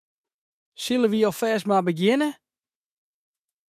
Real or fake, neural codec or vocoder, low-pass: fake; autoencoder, 48 kHz, 128 numbers a frame, DAC-VAE, trained on Japanese speech; 14.4 kHz